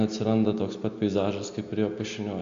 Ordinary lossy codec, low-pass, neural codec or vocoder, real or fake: MP3, 48 kbps; 7.2 kHz; none; real